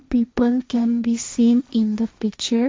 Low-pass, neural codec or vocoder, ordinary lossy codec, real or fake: none; codec, 16 kHz, 1.1 kbps, Voila-Tokenizer; none; fake